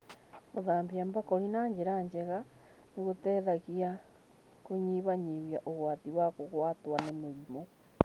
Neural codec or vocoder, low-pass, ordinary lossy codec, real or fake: none; 19.8 kHz; Opus, 32 kbps; real